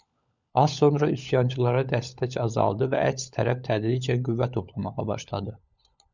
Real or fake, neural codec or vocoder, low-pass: fake; codec, 16 kHz, 16 kbps, FunCodec, trained on LibriTTS, 50 frames a second; 7.2 kHz